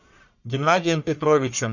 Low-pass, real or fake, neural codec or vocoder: 7.2 kHz; fake; codec, 44.1 kHz, 1.7 kbps, Pupu-Codec